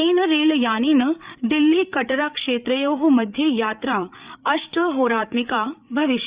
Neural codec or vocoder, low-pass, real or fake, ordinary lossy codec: codec, 16 kHz, 8 kbps, FreqCodec, larger model; 3.6 kHz; fake; Opus, 64 kbps